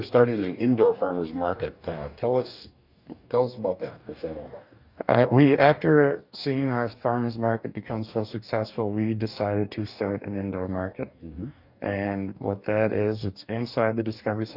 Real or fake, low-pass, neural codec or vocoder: fake; 5.4 kHz; codec, 44.1 kHz, 2.6 kbps, DAC